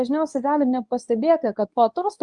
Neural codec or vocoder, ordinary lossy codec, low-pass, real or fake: codec, 24 kHz, 0.9 kbps, WavTokenizer, medium speech release version 2; Opus, 32 kbps; 10.8 kHz; fake